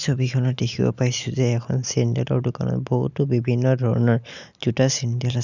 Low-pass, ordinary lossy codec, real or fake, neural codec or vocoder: 7.2 kHz; none; real; none